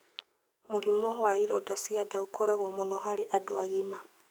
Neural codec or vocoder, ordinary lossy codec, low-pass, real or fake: codec, 44.1 kHz, 2.6 kbps, SNAC; none; none; fake